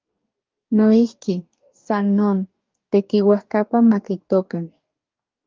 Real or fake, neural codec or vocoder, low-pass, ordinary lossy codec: fake; codec, 44.1 kHz, 2.6 kbps, DAC; 7.2 kHz; Opus, 24 kbps